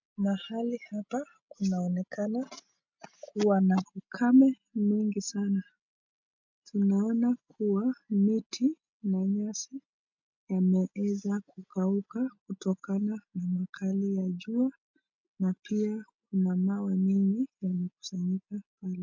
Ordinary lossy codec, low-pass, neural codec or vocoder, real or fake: MP3, 64 kbps; 7.2 kHz; none; real